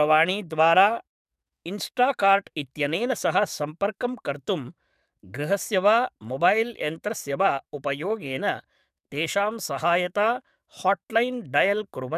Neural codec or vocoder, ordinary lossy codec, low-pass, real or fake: codec, 44.1 kHz, 7.8 kbps, DAC; none; 14.4 kHz; fake